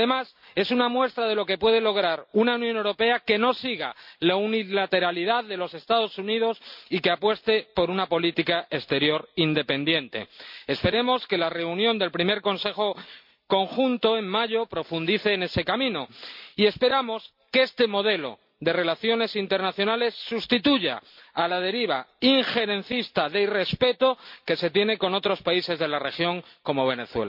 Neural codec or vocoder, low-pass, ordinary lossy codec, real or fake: none; 5.4 kHz; MP3, 48 kbps; real